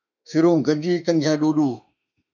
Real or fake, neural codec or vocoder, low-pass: fake; autoencoder, 48 kHz, 32 numbers a frame, DAC-VAE, trained on Japanese speech; 7.2 kHz